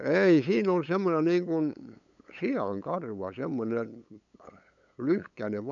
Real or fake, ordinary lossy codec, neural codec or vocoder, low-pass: fake; none; codec, 16 kHz, 8 kbps, FunCodec, trained on LibriTTS, 25 frames a second; 7.2 kHz